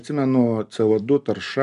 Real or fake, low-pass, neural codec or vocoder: real; 10.8 kHz; none